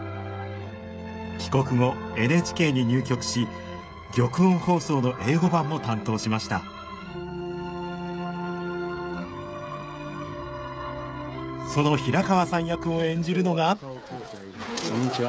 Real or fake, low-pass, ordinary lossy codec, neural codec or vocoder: fake; none; none; codec, 16 kHz, 16 kbps, FreqCodec, smaller model